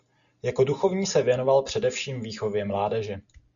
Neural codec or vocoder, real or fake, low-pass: none; real; 7.2 kHz